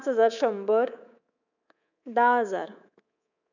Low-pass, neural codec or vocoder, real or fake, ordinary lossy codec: 7.2 kHz; codec, 24 kHz, 3.1 kbps, DualCodec; fake; none